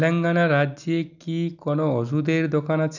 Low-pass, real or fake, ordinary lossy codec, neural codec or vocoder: 7.2 kHz; real; none; none